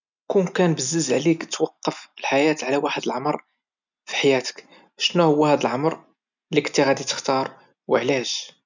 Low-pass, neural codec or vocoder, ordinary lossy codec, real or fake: 7.2 kHz; none; none; real